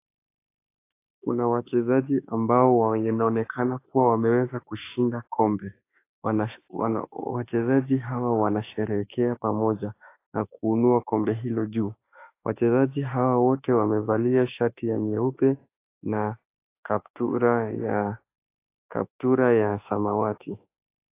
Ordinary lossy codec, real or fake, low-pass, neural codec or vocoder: AAC, 24 kbps; fake; 3.6 kHz; autoencoder, 48 kHz, 32 numbers a frame, DAC-VAE, trained on Japanese speech